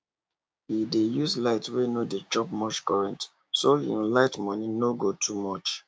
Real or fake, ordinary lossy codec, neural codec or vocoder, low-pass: fake; none; codec, 16 kHz, 6 kbps, DAC; none